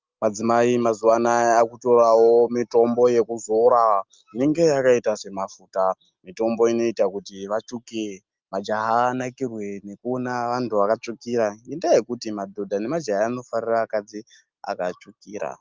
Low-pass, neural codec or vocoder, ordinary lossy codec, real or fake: 7.2 kHz; none; Opus, 32 kbps; real